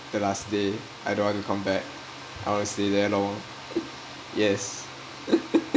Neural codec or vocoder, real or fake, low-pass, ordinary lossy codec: none; real; none; none